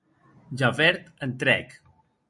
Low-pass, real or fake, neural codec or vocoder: 10.8 kHz; real; none